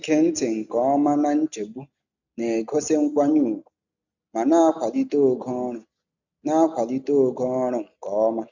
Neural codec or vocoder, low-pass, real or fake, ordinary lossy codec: none; 7.2 kHz; real; none